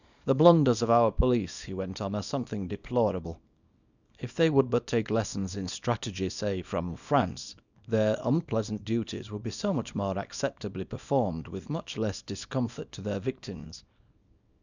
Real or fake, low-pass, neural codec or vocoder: fake; 7.2 kHz; codec, 24 kHz, 0.9 kbps, WavTokenizer, small release